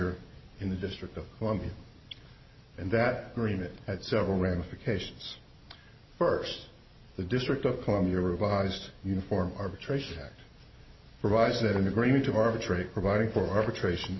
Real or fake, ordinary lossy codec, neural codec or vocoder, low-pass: real; MP3, 24 kbps; none; 7.2 kHz